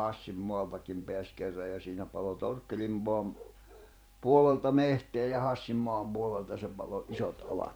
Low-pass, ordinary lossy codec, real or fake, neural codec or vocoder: none; none; fake; vocoder, 44.1 kHz, 128 mel bands every 512 samples, BigVGAN v2